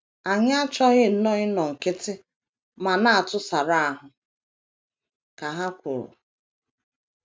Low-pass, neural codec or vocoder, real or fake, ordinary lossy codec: none; none; real; none